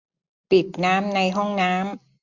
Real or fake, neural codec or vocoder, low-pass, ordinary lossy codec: real; none; 7.2 kHz; Opus, 64 kbps